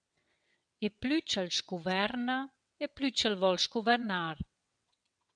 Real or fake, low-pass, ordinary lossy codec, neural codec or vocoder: fake; 9.9 kHz; MP3, 96 kbps; vocoder, 22.05 kHz, 80 mel bands, WaveNeXt